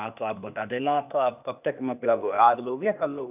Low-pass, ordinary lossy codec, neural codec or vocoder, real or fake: 3.6 kHz; none; codec, 16 kHz, 0.8 kbps, ZipCodec; fake